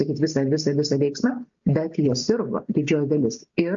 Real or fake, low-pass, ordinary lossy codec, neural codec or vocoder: real; 7.2 kHz; AAC, 64 kbps; none